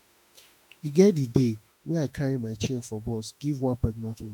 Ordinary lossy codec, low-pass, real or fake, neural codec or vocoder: none; 19.8 kHz; fake; autoencoder, 48 kHz, 32 numbers a frame, DAC-VAE, trained on Japanese speech